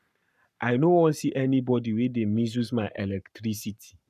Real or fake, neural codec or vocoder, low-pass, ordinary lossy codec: fake; codec, 44.1 kHz, 7.8 kbps, Pupu-Codec; 14.4 kHz; none